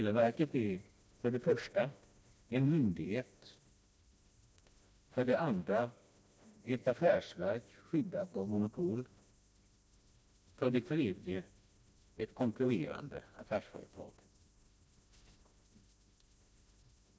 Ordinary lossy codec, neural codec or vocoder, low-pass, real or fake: none; codec, 16 kHz, 1 kbps, FreqCodec, smaller model; none; fake